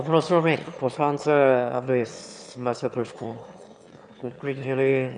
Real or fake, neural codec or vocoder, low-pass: fake; autoencoder, 22.05 kHz, a latent of 192 numbers a frame, VITS, trained on one speaker; 9.9 kHz